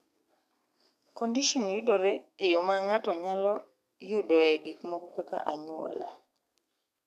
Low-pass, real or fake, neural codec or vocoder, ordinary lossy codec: 14.4 kHz; fake; codec, 32 kHz, 1.9 kbps, SNAC; none